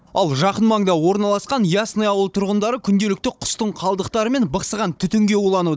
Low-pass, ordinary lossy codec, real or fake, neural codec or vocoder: none; none; fake; codec, 16 kHz, 16 kbps, FunCodec, trained on Chinese and English, 50 frames a second